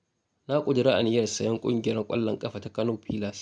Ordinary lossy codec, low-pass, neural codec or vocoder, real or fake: none; 9.9 kHz; none; real